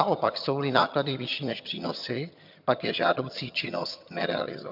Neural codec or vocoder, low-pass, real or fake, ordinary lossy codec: vocoder, 22.05 kHz, 80 mel bands, HiFi-GAN; 5.4 kHz; fake; MP3, 48 kbps